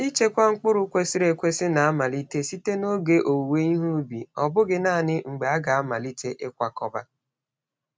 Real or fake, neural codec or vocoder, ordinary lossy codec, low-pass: real; none; none; none